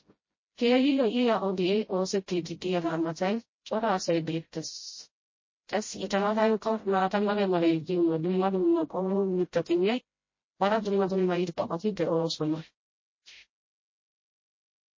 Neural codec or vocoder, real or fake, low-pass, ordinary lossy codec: codec, 16 kHz, 0.5 kbps, FreqCodec, smaller model; fake; 7.2 kHz; MP3, 32 kbps